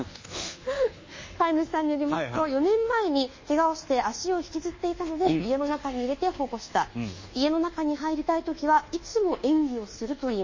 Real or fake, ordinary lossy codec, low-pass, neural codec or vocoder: fake; MP3, 32 kbps; 7.2 kHz; codec, 24 kHz, 1.2 kbps, DualCodec